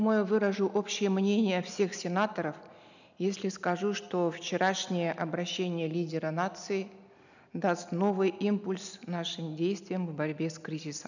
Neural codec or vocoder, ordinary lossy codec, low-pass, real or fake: none; none; 7.2 kHz; real